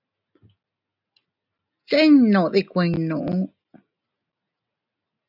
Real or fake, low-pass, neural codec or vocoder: real; 5.4 kHz; none